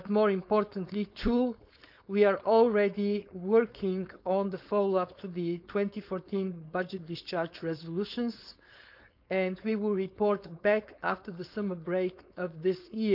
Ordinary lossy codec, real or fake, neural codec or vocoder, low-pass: none; fake; codec, 16 kHz, 4.8 kbps, FACodec; 5.4 kHz